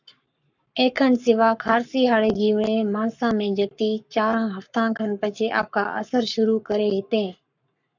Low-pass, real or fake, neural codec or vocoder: 7.2 kHz; fake; codec, 44.1 kHz, 7.8 kbps, Pupu-Codec